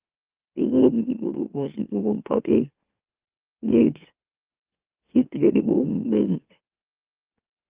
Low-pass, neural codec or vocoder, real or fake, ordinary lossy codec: 3.6 kHz; autoencoder, 44.1 kHz, a latent of 192 numbers a frame, MeloTTS; fake; Opus, 32 kbps